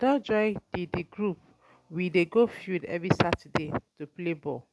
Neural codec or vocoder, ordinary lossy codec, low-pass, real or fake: none; none; none; real